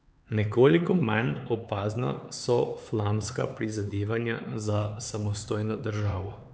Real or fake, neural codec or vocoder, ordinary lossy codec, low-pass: fake; codec, 16 kHz, 4 kbps, X-Codec, HuBERT features, trained on LibriSpeech; none; none